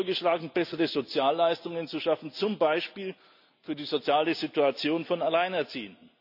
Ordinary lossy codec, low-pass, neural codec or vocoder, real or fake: none; 5.4 kHz; none; real